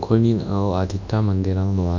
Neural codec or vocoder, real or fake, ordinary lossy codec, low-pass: codec, 24 kHz, 0.9 kbps, WavTokenizer, large speech release; fake; none; 7.2 kHz